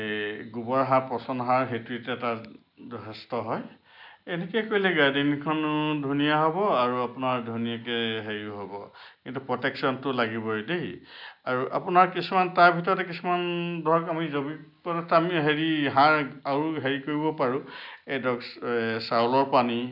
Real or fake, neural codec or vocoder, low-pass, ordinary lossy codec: real; none; 5.4 kHz; none